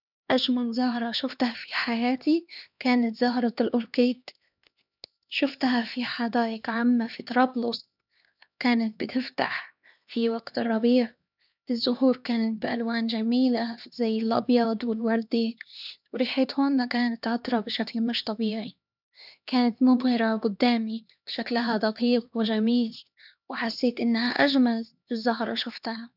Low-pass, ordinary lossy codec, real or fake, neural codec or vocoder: 5.4 kHz; none; fake; codec, 16 kHz, 2 kbps, X-Codec, HuBERT features, trained on LibriSpeech